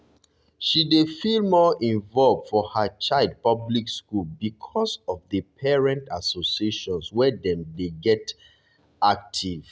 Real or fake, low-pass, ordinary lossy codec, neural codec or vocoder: real; none; none; none